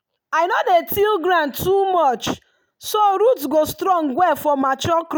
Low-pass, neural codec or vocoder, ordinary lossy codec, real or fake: none; none; none; real